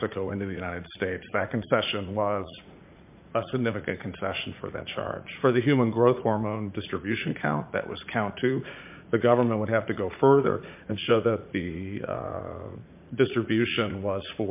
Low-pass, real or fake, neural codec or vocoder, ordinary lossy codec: 3.6 kHz; fake; vocoder, 44.1 kHz, 80 mel bands, Vocos; MP3, 32 kbps